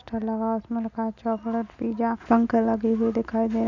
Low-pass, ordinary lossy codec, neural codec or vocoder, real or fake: 7.2 kHz; none; none; real